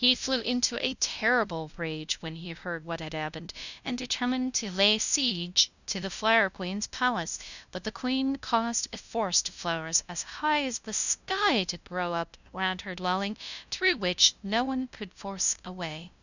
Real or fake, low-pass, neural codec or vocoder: fake; 7.2 kHz; codec, 16 kHz, 0.5 kbps, FunCodec, trained on LibriTTS, 25 frames a second